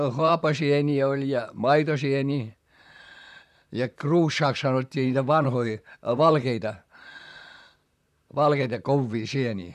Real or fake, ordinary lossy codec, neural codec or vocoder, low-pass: fake; none; vocoder, 44.1 kHz, 128 mel bands, Pupu-Vocoder; 14.4 kHz